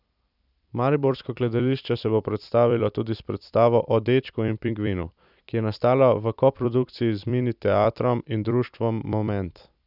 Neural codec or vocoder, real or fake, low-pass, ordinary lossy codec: vocoder, 44.1 kHz, 128 mel bands every 256 samples, BigVGAN v2; fake; 5.4 kHz; none